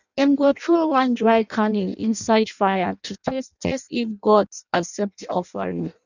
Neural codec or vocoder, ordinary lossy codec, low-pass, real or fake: codec, 16 kHz in and 24 kHz out, 0.6 kbps, FireRedTTS-2 codec; none; 7.2 kHz; fake